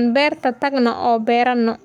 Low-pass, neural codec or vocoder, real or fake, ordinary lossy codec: 19.8 kHz; codec, 44.1 kHz, 7.8 kbps, Pupu-Codec; fake; none